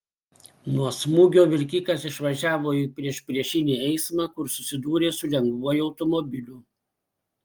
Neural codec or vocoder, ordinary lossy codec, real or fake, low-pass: none; Opus, 32 kbps; real; 19.8 kHz